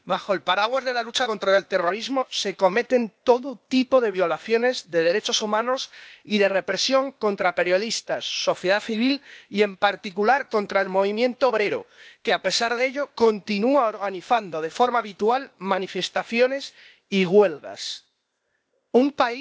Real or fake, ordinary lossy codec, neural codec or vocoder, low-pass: fake; none; codec, 16 kHz, 0.8 kbps, ZipCodec; none